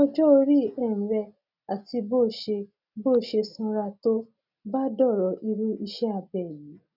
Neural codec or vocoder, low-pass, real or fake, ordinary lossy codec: none; 5.4 kHz; real; none